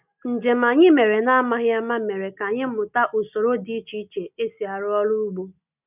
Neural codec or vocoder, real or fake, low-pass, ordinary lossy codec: none; real; 3.6 kHz; none